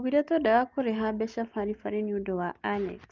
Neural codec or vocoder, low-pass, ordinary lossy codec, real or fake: none; 7.2 kHz; Opus, 32 kbps; real